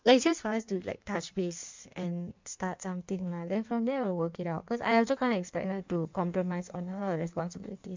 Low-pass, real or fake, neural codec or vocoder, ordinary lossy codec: 7.2 kHz; fake; codec, 16 kHz in and 24 kHz out, 1.1 kbps, FireRedTTS-2 codec; MP3, 64 kbps